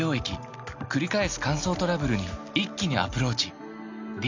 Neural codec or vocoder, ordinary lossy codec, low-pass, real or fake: none; MP3, 64 kbps; 7.2 kHz; real